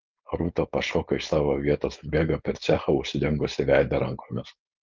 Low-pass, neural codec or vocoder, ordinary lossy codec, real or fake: 7.2 kHz; codec, 16 kHz, 4.8 kbps, FACodec; Opus, 24 kbps; fake